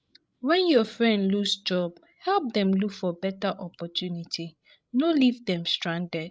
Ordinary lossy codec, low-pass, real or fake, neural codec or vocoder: none; none; fake; codec, 16 kHz, 16 kbps, FreqCodec, larger model